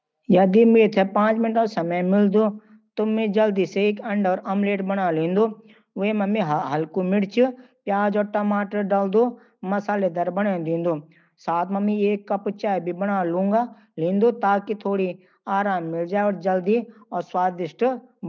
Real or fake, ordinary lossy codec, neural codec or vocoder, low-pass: real; none; none; none